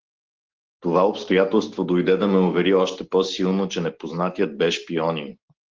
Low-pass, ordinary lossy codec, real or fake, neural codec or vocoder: 7.2 kHz; Opus, 16 kbps; real; none